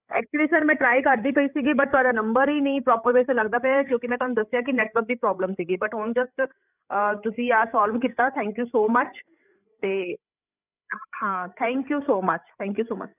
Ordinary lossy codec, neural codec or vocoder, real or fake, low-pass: none; codec, 16 kHz, 8 kbps, FreqCodec, larger model; fake; 3.6 kHz